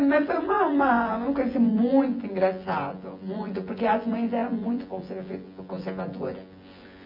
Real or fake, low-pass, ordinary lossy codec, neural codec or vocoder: fake; 5.4 kHz; MP3, 48 kbps; vocoder, 24 kHz, 100 mel bands, Vocos